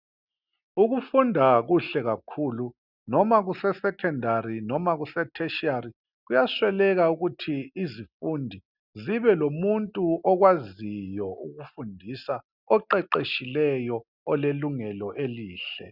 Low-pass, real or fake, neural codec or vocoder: 5.4 kHz; real; none